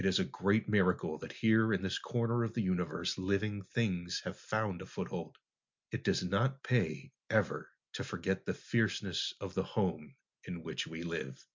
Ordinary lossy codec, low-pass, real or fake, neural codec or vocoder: MP3, 64 kbps; 7.2 kHz; real; none